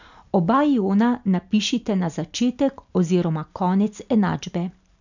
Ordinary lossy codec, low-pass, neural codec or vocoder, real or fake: none; 7.2 kHz; none; real